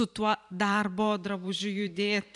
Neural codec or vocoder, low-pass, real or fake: vocoder, 44.1 kHz, 128 mel bands every 512 samples, BigVGAN v2; 10.8 kHz; fake